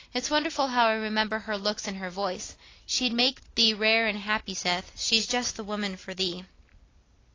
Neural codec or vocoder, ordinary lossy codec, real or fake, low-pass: none; AAC, 32 kbps; real; 7.2 kHz